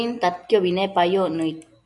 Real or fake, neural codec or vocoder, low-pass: real; none; 10.8 kHz